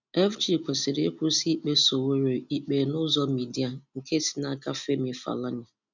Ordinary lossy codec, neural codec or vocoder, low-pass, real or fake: none; none; 7.2 kHz; real